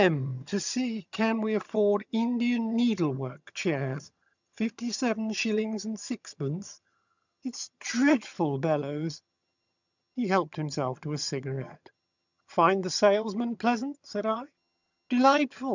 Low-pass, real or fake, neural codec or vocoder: 7.2 kHz; fake; vocoder, 22.05 kHz, 80 mel bands, HiFi-GAN